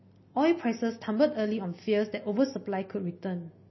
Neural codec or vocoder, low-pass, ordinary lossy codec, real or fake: none; 7.2 kHz; MP3, 24 kbps; real